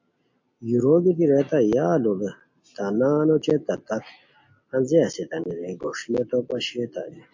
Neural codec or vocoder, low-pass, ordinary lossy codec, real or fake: none; 7.2 kHz; MP3, 48 kbps; real